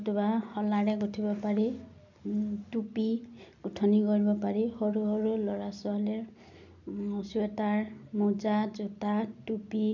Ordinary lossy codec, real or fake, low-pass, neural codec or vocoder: none; real; 7.2 kHz; none